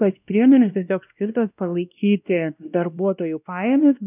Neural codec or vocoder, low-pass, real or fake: codec, 16 kHz, 1 kbps, X-Codec, WavLM features, trained on Multilingual LibriSpeech; 3.6 kHz; fake